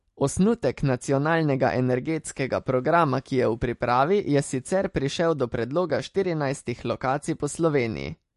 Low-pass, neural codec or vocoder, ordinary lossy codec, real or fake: 14.4 kHz; none; MP3, 48 kbps; real